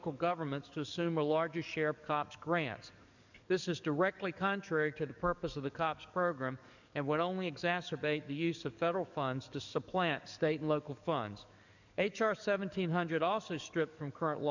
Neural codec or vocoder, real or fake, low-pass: codec, 44.1 kHz, 7.8 kbps, DAC; fake; 7.2 kHz